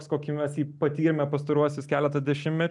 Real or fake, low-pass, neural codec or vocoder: real; 10.8 kHz; none